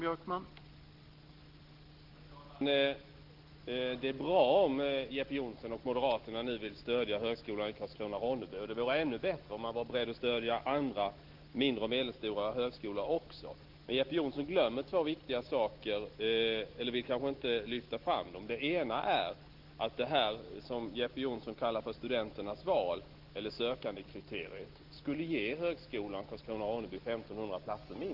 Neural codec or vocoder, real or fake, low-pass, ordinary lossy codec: none; real; 5.4 kHz; Opus, 16 kbps